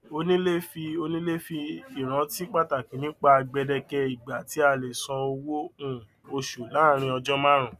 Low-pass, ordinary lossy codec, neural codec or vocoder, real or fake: 14.4 kHz; none; none; real